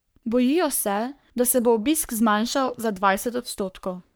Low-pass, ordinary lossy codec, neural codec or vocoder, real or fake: none; none; codec, 44.1 kHz, 3.4 kbps, Pupu-Codec; fake